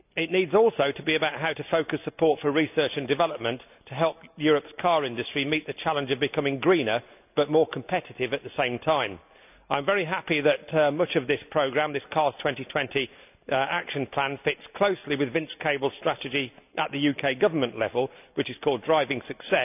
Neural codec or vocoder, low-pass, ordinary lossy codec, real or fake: none; 3.6 kHz; none; real